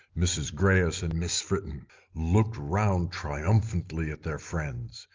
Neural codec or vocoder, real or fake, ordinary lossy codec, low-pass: none; real; Opus, 32 kbps; 7.2 kHz